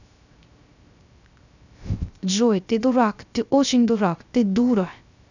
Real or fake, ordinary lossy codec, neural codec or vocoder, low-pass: fake; none; codec, 16 kHz, 0.3 kbps, FocalCodec; 7.2 kHz